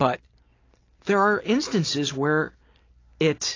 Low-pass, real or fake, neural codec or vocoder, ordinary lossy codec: 7.2 kHz; real; none; AAC, 32 kbps